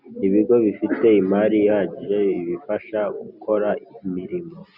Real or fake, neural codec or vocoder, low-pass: real; none; 5.4 kHz